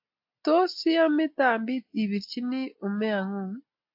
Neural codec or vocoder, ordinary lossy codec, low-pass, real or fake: none; AAC, 48 kbps; 5.4 kHz; real